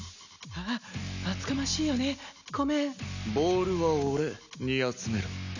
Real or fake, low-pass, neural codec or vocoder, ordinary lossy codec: real; 7.2 kHz; none; none